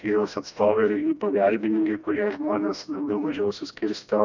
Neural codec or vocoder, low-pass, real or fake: codec, 16 kHz, 1 kbps, FreqCodec, smaller model; 7.2 kHz; fake